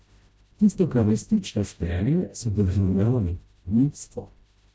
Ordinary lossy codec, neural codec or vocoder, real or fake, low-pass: none; codec, 16 kHz, 0.5 kbps, FreqCodec, smaller model; fake; none